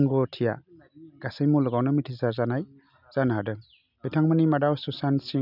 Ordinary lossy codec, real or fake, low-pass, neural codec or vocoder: none; real; 5.4 kHz; none